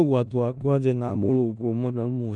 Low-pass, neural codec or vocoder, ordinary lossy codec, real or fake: 9.9 kHz; codec, 16 kHz in and 24 kHz out, 0.4 kbps, LongCat-Audio-Codec, four codebook decoder; none; fake